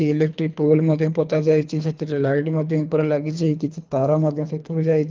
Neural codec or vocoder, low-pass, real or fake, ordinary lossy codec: codec, 24 kHz, 3 kbps, HILCodec; 7.2 kHz; fake; Opus, 32 kbps